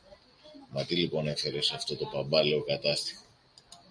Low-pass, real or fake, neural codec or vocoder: 9.9 kHz; real; none